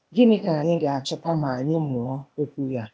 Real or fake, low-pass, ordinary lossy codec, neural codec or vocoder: fake; none; none; codec, 16 kHz, 0.8 kbps, ZipCodec